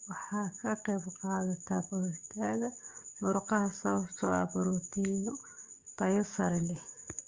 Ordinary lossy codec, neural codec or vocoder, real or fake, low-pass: Opus, 32 kbps; codec, 44.1 kHz, 7.8 kbps, DAC; fake; 7.2 kHz